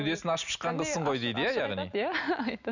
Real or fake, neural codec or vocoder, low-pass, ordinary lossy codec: real; none; 7.2 kHz; none